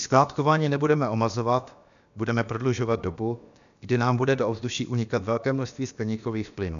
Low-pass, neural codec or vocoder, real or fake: 7.2 kHz; codec, 16 kHz, about 1 kbps, DyCAST, with the encoder's durations; fake